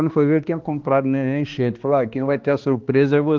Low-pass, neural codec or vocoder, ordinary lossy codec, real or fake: 7.2 kHz; codec, 16 kHz, 2 kbps, X-Codec, HuBERT features, trained on balanced general audio; Opus, 16 kbps; fake